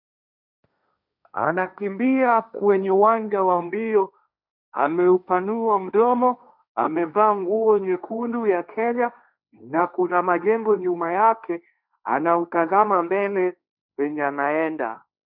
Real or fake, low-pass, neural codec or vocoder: fake; 5.4 kHz; codec, 16 kHz, 1.1 kbps, Voila-Tokenizer